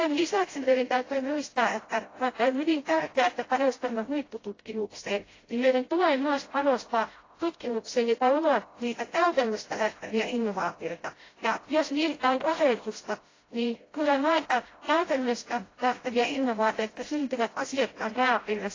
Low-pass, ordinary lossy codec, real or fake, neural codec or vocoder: 7.2 kHz; AAC, 32 kbps; fake; codec, 16 kHz, 0.5 kbps, FreqCodec, smaller model